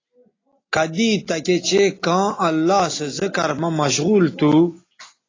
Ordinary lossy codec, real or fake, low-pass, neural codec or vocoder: AAC, 32 kbps; real; 7.2 kHz; none